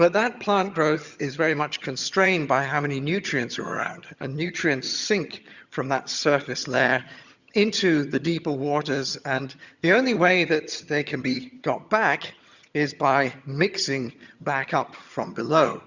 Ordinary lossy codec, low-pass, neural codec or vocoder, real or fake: Opus, 64 kbps; 7.2 kHz; vocoder, 22.05 kHz, 80 mel bands, HiFi-GAN; fake